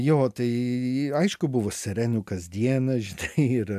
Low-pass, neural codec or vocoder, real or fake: 14.4 kHz; none; real